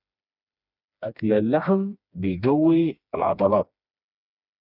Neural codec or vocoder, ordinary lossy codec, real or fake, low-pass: codec, 16 kHz, 2 kbps, FreqCodec, smaller model; none; fake; 5.4 kHz